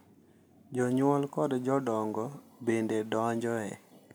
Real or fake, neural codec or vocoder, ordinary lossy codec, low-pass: real; none; none; none